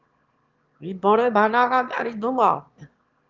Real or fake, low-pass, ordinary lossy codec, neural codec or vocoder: fake; 7.2 kHz; Opus, 32 kbps; autoencoder, 22.05 kHz, a latent of 192 numbers a frame, VITS, trained on one speaker